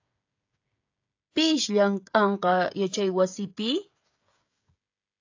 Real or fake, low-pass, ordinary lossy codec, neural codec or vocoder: fake; 7.2 kHz; AAC, 48 kbps; codec, 16 kHz, 16 kbps, FreqCodec, smaller model